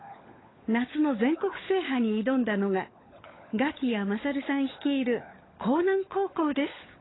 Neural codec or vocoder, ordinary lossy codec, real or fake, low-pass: codec, 16 kHz, 16 kbps, FunCodec, trained on LibriTTS, 50 frames a second; AAC, 16 kbps; fake; 7.2 kHz